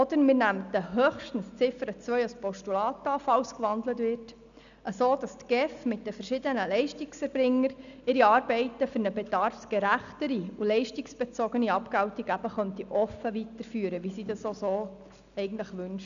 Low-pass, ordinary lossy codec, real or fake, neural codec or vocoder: 7.2 kHz; none; real; none